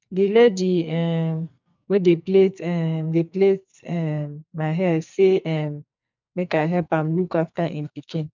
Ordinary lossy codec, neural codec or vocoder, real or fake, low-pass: MP3, 64 kbps; codec, 44.1 kHz, 2.6 kbps, SNAC; fake; 7.2 kHz